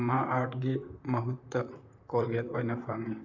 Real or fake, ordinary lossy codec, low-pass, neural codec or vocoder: fake; none; 7.2 kHz; vocoder, 44.1 kHz, 128 mel bands, Pupu-Vocoder